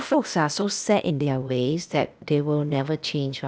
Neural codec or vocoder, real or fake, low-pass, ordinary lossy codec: codec, 16 kHz, 0.8 kbps, ZipCodec; fake; none; none